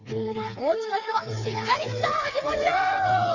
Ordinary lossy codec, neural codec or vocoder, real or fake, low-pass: MP3, 64 kbps; codec, 16 kHz, 4 kbps, FreqCodec, smaller model; fake; 7.2 kHz